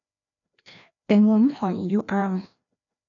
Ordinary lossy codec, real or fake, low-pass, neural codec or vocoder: none; fake; 7.2 kHz; codec, 16 kHz, 1 kbps, FreqCodec, larger model